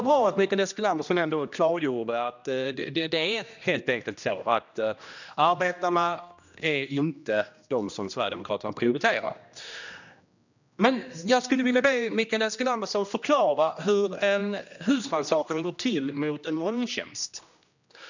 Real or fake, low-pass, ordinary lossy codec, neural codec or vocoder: fake; 7.2 kHz; none; codec, 16 kHz, 1 kbps, X-Codec, HuBERT features, trained on general audio